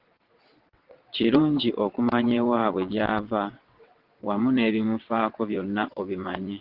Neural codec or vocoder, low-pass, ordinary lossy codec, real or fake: vocoder, 22.05 kHz, 80 mel bands, WaveNeXt; 5.4 kHz; Opus, 16 kbps; fake